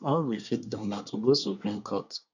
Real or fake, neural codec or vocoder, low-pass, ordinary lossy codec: fake; codec, 24 kHz, 1 kbps, SNAC; 7.2 kHz; none